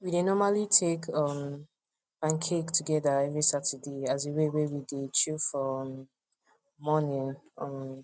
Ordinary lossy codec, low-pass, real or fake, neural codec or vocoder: none; none; real; none